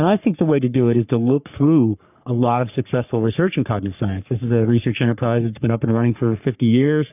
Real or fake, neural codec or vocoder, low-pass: fake; codec, 44.1 kHz, 3.4 kbps, Pupu-Codec; 3.6 kHz